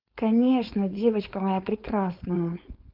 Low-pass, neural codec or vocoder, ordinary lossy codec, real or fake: 5.4 kHz; codec, 16 kHz, 4.8 kbps, FACodec; Opus, 24 kbps; fake